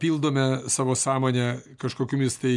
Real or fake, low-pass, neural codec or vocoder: real; 10.8 kHz; none